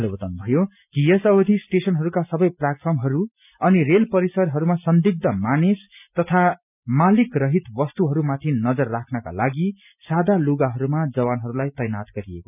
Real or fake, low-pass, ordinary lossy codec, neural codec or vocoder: real; 3.6 kHz; none; none